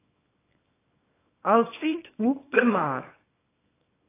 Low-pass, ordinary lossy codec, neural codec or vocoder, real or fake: 3.6 kHz; AAC, 16 kbps; codec, 24 kHz, 0.9 kbps, WavTokenizer, small release; fake